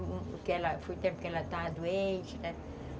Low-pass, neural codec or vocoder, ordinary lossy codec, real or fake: none; none; none; real